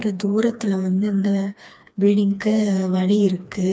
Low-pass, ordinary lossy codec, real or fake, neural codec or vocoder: none; none; fake; codec, 16 kHz, 2 kbps, FreqCodec, smaller model